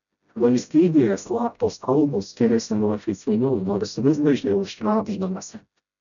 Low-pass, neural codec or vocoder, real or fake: 7.2 kHz; codec, 16 kHz, 0.5 kbps, FreqCodec, smaller model; fake